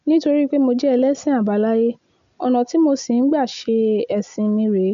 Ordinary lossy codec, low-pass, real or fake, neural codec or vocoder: MP3, 64 kbps; 7.2 kHz; real; none